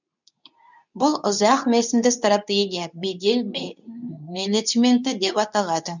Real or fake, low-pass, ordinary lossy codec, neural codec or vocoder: fake; 7.2 kHz; none; codec, 24 kHz, 0.9 kbps, WavTokenizer, medium speech release version 2